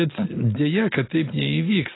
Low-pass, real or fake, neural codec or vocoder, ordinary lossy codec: 7.2 kHz; real; none; AAC, 16 kbps